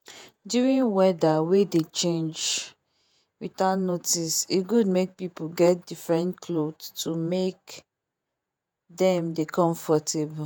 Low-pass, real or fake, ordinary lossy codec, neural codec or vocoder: none; fake; none; vocoder, 48 kHz, 128 mel bands, Vocos